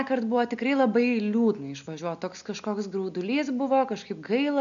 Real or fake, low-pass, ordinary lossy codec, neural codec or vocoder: real; 7.2 kHz; MP3, 96 kbps; none